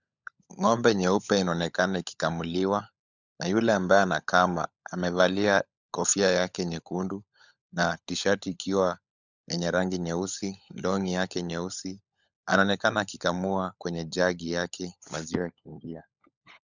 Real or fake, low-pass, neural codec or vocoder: fake; 7.2 kHz; codec, 16 kHz, 16 kbps, FunCodec, trained on LibriTTS, 50 frames a second